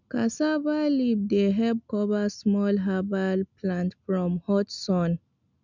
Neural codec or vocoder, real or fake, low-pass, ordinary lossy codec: none; real; 7.2 kHz; none